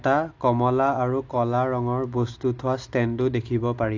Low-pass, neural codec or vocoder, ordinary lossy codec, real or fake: 7.2 kHz; none; AAC, 48 kbps; real